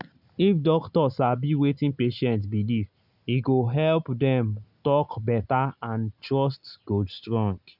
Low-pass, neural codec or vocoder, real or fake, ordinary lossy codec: 5.4 kHz; none; real; none